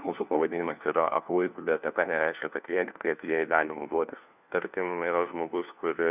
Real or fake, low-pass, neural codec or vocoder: fake; 3.6 kHz; codec, 16 kHz, 1 kbps, FunCodec, trained on LibriTTS, 50 frames a second